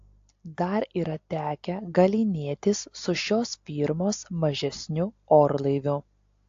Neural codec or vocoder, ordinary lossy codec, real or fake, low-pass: none; AAC, 48 kbps; real; 7.2 kHz